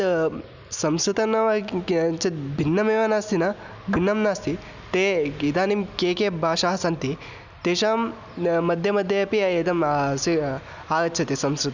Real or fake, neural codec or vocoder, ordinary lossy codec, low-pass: real; none; none; 7.2 kHz